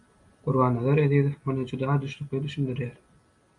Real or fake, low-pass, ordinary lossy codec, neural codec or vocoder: real; 10.8 kHz; MP3, 96 kbps; none